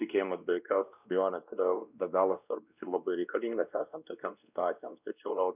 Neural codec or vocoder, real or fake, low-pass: codec, 16 kHz, 1 kbps, X-Codec, WavLM features, trained on Multilingual LibriSpeech; fake; 3.6 kHz